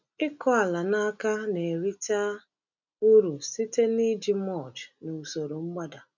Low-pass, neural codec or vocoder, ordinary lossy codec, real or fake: 7.2 kHz; none; none; real